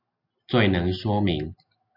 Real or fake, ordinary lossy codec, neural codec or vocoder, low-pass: real; AAC, 48 kbps; none; 5.4 kHz